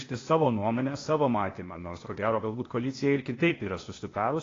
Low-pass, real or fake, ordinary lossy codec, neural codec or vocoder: 7.2 kHz; fake; AAC, 32 kbps; codec, 16 kHz, 0.8 kbps, ZipCodec